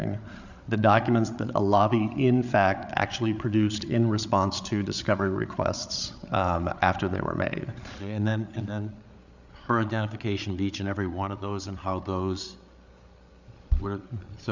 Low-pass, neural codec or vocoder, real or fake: 7.2 kHz; codec, 16 kHz, 8 kbps, FreqCodec, larger model; fake